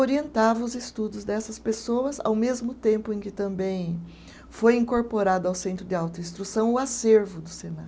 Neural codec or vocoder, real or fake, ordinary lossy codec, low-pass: none; real; none; none